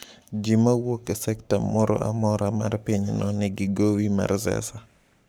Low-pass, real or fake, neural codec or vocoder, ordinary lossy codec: none; fake; codec, 44.1 kHz, 7.8 kbps, Pupu-Codec; none